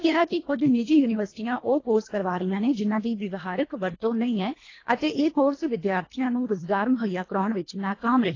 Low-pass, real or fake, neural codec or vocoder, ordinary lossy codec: 7.2 kHz; fake; codec, 24 kHz, 1.5 kbps, HILCodec; AAC, 32 kbps